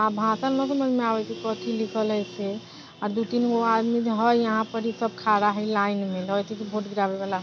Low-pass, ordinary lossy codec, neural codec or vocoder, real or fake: none; none; none; real